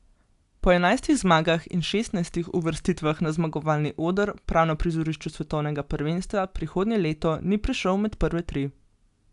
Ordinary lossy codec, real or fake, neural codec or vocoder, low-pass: AAC, 96 kbps; real; none; 10.8 kHz